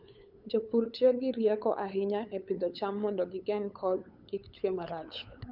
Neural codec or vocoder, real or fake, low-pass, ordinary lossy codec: codec, 16 kHz, 8 kbps, FunCodec, trained on LibriTTS, 25 frames a second; fake; 5.4 kHz; none